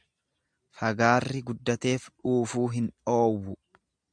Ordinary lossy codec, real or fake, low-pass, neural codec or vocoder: MP3, 96 kbps; real; 9.9 kHz; none